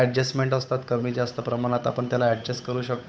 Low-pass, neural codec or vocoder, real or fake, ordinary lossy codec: none; codec, 16 kHz, 8 kbps, FunCodec, trained on Chinese and English, 25 frames a second; fake; none